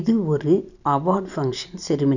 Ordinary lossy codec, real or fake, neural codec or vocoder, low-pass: none; real; none; 7.2 kHz